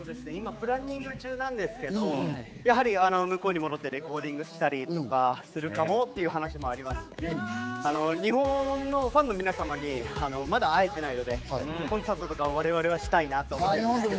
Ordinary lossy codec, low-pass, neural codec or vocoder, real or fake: none; none; codec, 16 kHz, 4 kbps, X-Codec, HuBERT features, trained on general audio; fake